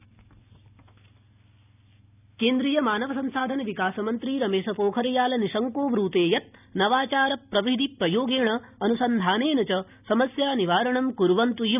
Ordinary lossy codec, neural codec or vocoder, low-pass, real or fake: none; none; 3.6 kHz; real